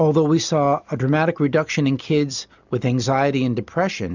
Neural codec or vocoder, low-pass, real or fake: none; 7.2 kHz; real